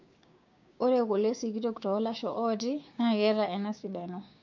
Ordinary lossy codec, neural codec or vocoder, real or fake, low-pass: MP3, 48 kbps; codec, 16 kHz, 6 kbps, DAC; fake; 7.2 kHz